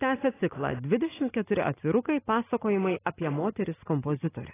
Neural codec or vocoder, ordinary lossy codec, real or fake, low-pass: none; AAC, 16 kbps; real; 3.6 kHz